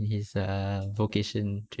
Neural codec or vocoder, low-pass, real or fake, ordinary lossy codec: none; none; real; none